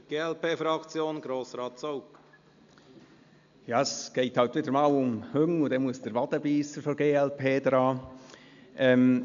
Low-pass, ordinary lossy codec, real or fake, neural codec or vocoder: 7.2 kHz; MP3, 64 kbps; real; none